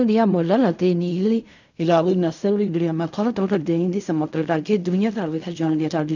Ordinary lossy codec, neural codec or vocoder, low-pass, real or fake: none; codec, 16 kHz in and 24 kHz out, 0.4 kbps, LongCat-Audio-Codec, fine tuned four codebook decoder; 7.2 kHz; fake